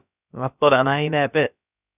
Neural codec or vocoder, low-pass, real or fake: codec, 16 kHz, about 1 kbps, DyCAST, with the encoder's durations; 3.6 kHz; fake